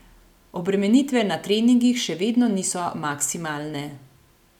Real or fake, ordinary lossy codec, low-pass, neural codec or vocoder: real; none; 19.8 kHz; none